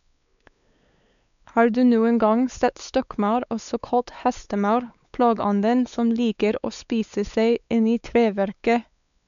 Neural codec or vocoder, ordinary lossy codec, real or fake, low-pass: codec, 16 kHz, 4 kbps, X-Codec, WavLM features, trained on Multilingual LibriSpeech; none; fake; 7.2 kHz